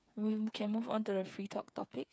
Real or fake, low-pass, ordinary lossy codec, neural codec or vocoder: fake; none; none; codec, 16 kHz, 8 kbps, FreqCodec, smaller model